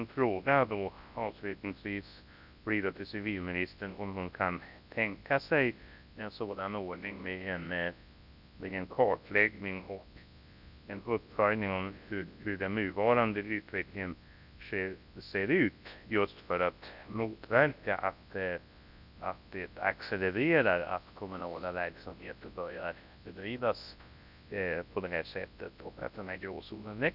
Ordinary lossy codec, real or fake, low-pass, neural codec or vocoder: none; fake; 5.4 kHz; codec, 24 kHz, 0.9 kbps, WavTokenizer, large speech release